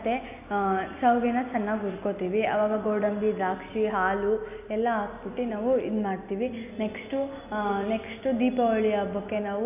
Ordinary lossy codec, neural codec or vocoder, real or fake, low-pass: none; none; real; 3.6 kHz